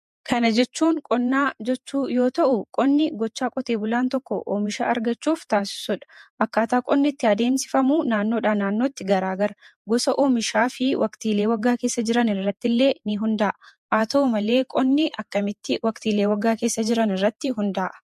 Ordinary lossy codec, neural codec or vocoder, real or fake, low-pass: MP3, 64 kbps; vocoder, 48 kHz, 128 mel bands, Vocos; fake; 14.4 kHz